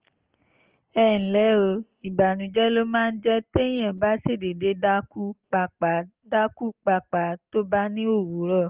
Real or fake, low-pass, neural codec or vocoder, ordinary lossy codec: real; 3.6 kHz; none; Opus, 16 kbps